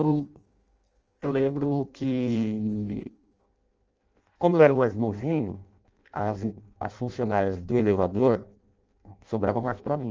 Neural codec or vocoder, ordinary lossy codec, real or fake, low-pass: codec, 16 kHz in and 24 kHz out, 0.6 kbps, FireRedTTS-2 codec; Opus, 24 kbps; fake; 7.2 kHz